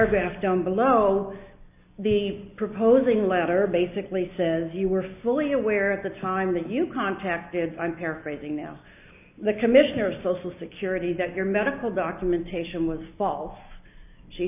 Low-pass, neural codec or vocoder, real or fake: 3.6 kHz; none; real